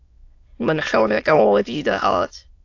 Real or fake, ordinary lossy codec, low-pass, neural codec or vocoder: fake; AAC, 48 kbps; 7.2 kHz; autoencoder, 22.05 kHz, a latent of 192 numbers a frame, VITS, trained on many speakers